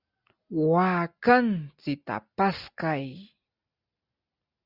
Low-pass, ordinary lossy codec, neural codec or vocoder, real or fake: 5.4 kHz; Opus, 64 kbps; none; real